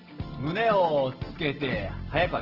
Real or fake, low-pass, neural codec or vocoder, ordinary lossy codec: real; 5.4 kHz; none; Opus, 16 kbps